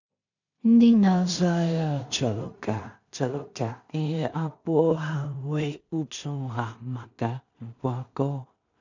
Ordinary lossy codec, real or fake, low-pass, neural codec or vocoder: none; fake; 7.2 kHz; codec, 16 kHz in and 24 kHz out, 0.4 kbps, LongCat-Audio-Codec, two codebook decoder